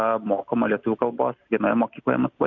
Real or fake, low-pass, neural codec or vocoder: real; 7.2 kHz; none